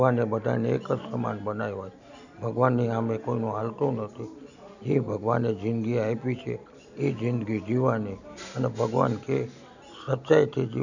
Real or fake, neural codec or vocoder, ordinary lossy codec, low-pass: real; none; none; 7.2 kHz